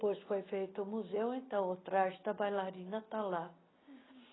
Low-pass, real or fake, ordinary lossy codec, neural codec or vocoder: 7.2 kHz; real; AAC, 16 kbps; none